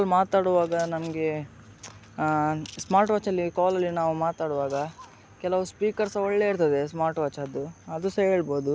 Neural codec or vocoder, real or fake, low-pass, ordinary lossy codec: none; real; none; none